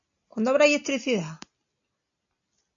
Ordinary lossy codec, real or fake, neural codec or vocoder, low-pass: AAC, 64 kbps; real; none; 7.2 kHz